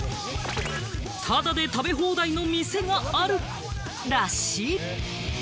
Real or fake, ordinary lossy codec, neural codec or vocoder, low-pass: real; none; none; none